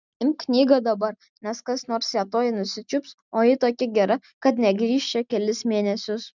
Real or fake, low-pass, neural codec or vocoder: real; 7.2 kHz; none